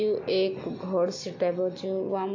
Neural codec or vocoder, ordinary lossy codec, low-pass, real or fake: none; AAC, 48 kbps; 7.2 kHz; real